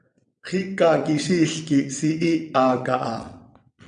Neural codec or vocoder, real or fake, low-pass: vocoder, 22.05 kHz, 80 mel bands, WaveNeXt; fake; 9.9 kHz